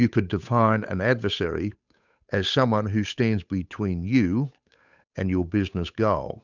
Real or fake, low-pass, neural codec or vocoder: fake; 7.2 kHz; codec, 16 kHz, 8 kbps, FunCodec, trained on Chinese and English, 25 frames a second